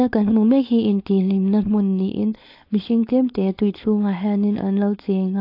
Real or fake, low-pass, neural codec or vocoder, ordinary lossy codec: fake; 5.4 kHz; codec, 16 kHz, 4.8 kbps, FACodec; AAC, 32 kbps